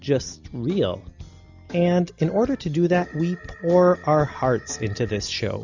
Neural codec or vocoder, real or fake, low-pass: none; real; 7.2 kHz